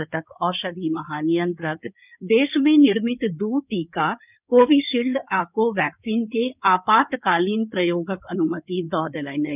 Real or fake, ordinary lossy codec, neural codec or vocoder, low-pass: fake; none; codec, 16 kHz in and 24 kHz out, 2.2 kbps, FireRedTTS-2 codec; 3.6 kHz